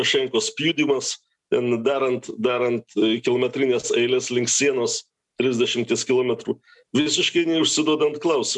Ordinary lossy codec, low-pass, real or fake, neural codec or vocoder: MP3, 96 kbps; 10.8 kHz; real; none